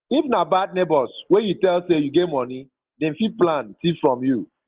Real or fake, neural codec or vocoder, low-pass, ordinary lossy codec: real; none; 3.6 kHz; Opus, 16 kbps